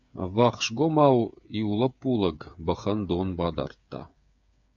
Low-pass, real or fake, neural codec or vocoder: 7.2 kHz; fake; codec, 16 kHz, 8 kbps, FreqCodec, smaller model